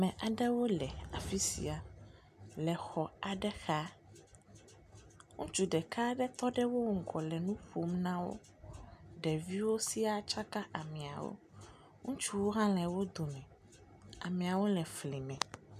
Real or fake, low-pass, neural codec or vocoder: real; 14.4 kHz; none